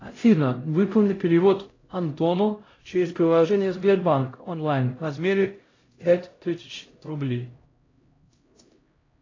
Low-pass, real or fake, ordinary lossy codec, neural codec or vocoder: 7.2 kHz; fake; AAC, 32 kbps; codec, 16 kHz, 0.5 kbps, X-Codec, HuBERT features, trained on LibriSpeech